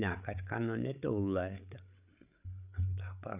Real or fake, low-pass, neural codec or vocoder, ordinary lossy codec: fake; 3.6 kHz; codec, 16 kHz, 8 kbps, FreqCodec, larger model; none